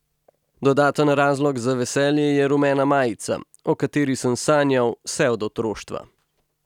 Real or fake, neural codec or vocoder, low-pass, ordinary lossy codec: real; none; 19.8 kHz; none